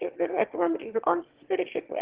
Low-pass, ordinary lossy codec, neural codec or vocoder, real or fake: 3.6 kHz; Opus, 16 kbps; autoencoder, 22.05 kHz, a latent of 192 numbers a frame, VITS, trained on one speaker; fake